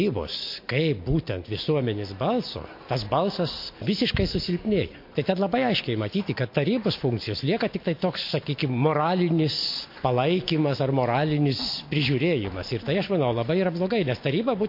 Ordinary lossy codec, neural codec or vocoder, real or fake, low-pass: MP3, 32 kbps; none; real; 5.4 kHz